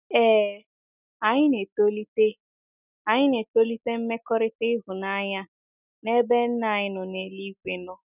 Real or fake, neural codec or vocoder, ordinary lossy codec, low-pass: real; none; none; 3.6 kHz